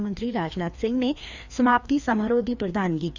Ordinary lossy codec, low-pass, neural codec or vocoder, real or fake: none; 7.2 kHz; codec, 16 kHz, 2 kbps, FreqCodec, larger model; fake